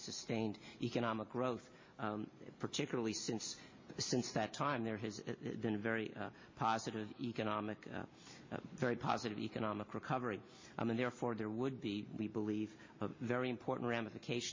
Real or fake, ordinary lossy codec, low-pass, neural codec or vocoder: real; MP3, 32 kbps; 7.2 kHz; none